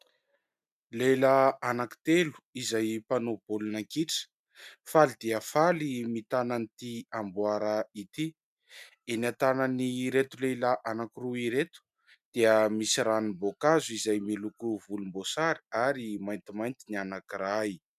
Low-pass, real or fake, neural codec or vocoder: 14.4 kHz; real; none